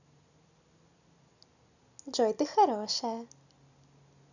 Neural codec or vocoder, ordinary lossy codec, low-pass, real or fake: none; none; 7.2 kHz; real